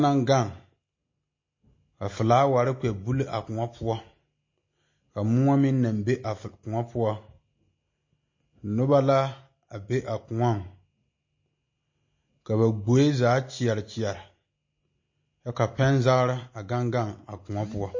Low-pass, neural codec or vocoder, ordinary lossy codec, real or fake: 7.2 kHz; none; MP3, 32 kbps; real